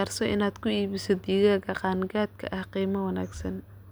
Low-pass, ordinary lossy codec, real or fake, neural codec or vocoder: none; none; real; none